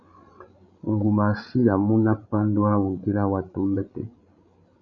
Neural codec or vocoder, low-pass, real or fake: codec, 16 kHz, 16 kbps, FreqCodec, larger model; 7.2 kHz; fake